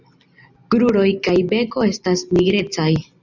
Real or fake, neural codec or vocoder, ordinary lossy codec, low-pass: real; none; Opus, 64 kbps; 7.2 kHz